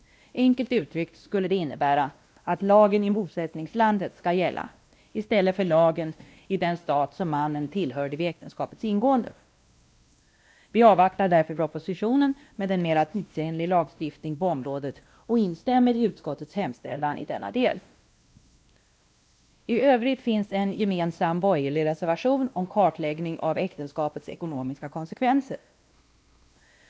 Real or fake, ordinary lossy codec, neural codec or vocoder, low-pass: fake; none; codec, 16 kHz, 1 kbps, X-Codec, WavLM features, trained on Multilingual LibriSpeech; none